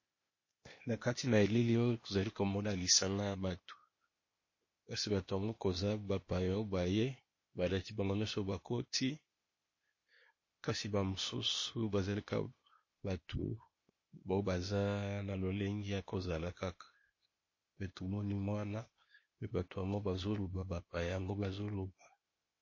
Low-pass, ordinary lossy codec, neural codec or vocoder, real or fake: 7.2 kHz; MP3, 32 kbps; codec, 16 kHz, 0.8 kbps, ZipCodec; fake